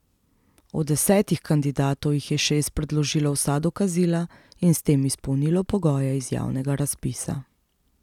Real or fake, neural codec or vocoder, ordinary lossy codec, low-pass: real; none; none; 19.8 kHz